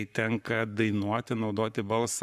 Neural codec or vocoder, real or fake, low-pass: codec, 44.1 kHz, 7.8 kbps, Pupu-Codec; fake; 14.4 kHz